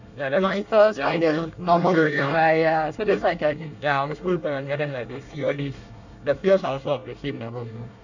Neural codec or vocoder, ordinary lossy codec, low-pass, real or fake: codec, 24 kHz, 1 kbps, SNAC; none; 7.2 kHz; fake